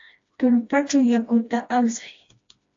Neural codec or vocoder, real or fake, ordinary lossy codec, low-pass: codec, 16 kHz, 1 kbps, FreqCodec, smaller model; fake; AAC, 48 kbps; 7.2 kHz